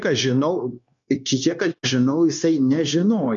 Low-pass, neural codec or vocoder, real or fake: 7.2 kHz; codec, 16 kHz, 0.9 kbps, LongCat-Audio-Codec; fake